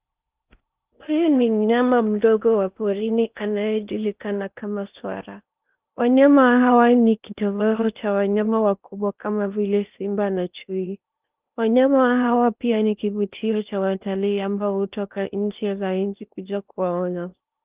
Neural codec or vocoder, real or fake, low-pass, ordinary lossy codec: codec, 16 kHz in and 24 kHz out, 0.8 kbps, FocalCodec, streaming, 65536 codes; fake; 3.6 kHz; Opus, 24 kbps